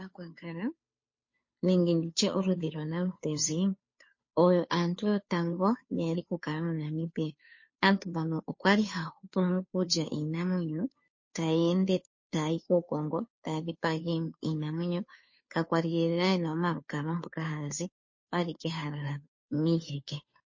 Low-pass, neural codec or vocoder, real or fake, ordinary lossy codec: 7.2 kHz; codec, 16 kHz, 2 kbps, FunCodec, trained on Chinese and English, 25 frames a second; fake; MP3, 32 kbps